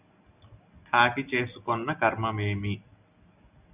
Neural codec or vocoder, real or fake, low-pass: none; real; 3.6 kHz